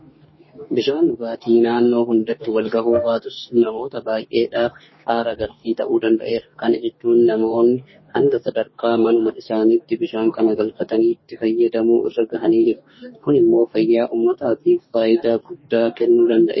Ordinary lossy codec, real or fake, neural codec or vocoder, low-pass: MP3, 24 kbps; fake; codec, 44.1 kHz, 2.6 kbps, DAC; 7.2 kHz